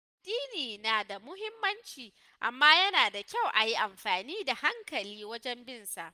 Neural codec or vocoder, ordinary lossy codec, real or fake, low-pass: none; Opus, 32 kbps; real; 14.4 kHz